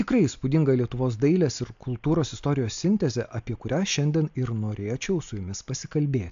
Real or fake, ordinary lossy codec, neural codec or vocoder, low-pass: real; AAC, 64 kbps; none; 7.2 kHz